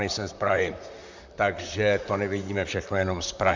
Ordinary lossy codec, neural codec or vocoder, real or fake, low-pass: MP3, 64 kbps; vocoder, 44.1 kHz, 128 mel bands, Pupu-Vocoder; fake; 7.2 kHz